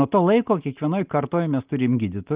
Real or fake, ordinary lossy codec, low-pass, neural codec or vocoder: real; Opus, 32 kbps; 3.6 kHz; none